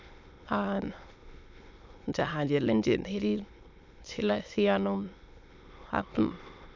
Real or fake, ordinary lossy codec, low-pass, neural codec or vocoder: fake; MP3, 64 kbps; 7.2 kHz; autoencoder, 22.05 kHz, a latent of 192 numbers a frame, VITS, trained on many speakers